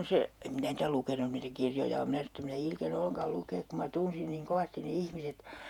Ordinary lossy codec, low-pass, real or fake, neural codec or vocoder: none; 19.8 kHz; fake; vocoder, 48 kHz, 128 mel bands, Vocos